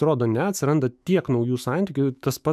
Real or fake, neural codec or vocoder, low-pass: fake; autoencoder, 48 kHz, 128 numbers a frame, DAC-VAE, trained on Japanese speech; 14.4 kHz